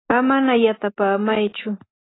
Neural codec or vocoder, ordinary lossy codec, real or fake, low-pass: none; AAC, 16 kbps; real; 7.2 kHz